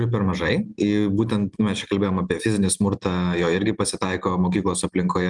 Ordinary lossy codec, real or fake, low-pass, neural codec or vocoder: Opus, 32 kbps; real; 10.8 kHz; none